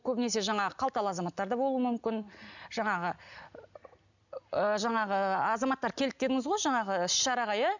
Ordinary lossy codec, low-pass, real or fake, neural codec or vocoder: none; 7.2 kHz; real; none